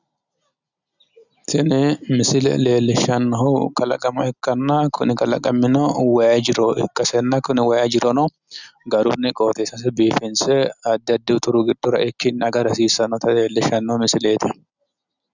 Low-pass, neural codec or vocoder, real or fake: 7.2 kHz; none; real